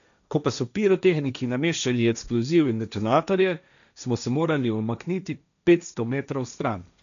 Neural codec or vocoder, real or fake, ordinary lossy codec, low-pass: codec, 16 kHz, 1.1 kbps, Voila-Tokenizer; fake; none; 7.2 kHz